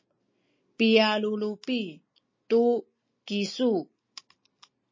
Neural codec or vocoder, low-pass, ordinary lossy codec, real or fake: none; 7.2 kHz; MP3, 32 kbps; real